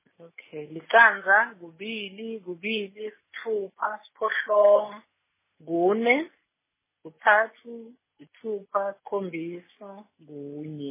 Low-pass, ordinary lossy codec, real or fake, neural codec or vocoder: 3.6 kHz; MP3, 16 kbps; real; none